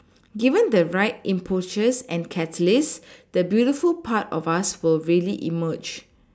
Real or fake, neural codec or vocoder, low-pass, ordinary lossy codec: real; none; none; none